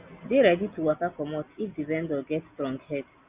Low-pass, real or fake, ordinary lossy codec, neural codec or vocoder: 3.6 kHz; real; Opus, 64 kbps; none